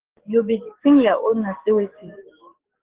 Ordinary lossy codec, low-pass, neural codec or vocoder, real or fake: Opus, 16 kbps; 3.6 kHz; codec, 44.1 kHz, 7.8 kbps, DAC; fake